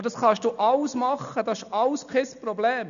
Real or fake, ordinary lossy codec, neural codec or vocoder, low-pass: real; none; none; 7.2 kHz